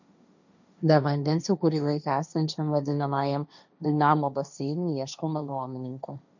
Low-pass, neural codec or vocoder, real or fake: 7.2 kHz; codec, 16 kHz, 1.1 kbps, Voila-Tokenizer; fake